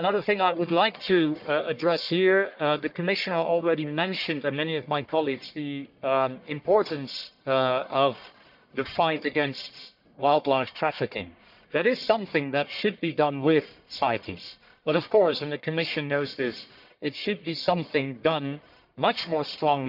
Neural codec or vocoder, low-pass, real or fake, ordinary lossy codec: codec, 44.1 kHz, 1.7 kbps, Pupu-Codec; 5.4 kHz; fake; none